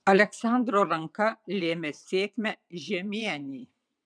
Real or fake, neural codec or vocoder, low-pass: fake; vocoder, 22.05 kHz, 80 mel bands, WaveNeXt; 9.9 kHz